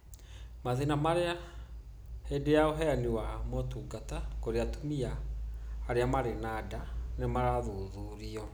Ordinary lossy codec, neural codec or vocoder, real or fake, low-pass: none; none; real; none